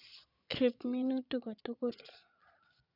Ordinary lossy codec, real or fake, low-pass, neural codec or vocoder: none; fake; 5.4 kHz; vocoder, 22.05 kHz, 80 mel bands, Vocos